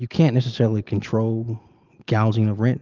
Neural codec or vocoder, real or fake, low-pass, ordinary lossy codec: none; real; 7.2 kHz; Opus, 24 kbps